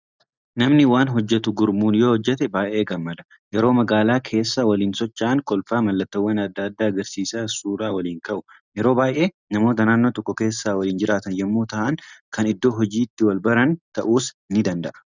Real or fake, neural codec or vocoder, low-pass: real; none; 7.2 kHz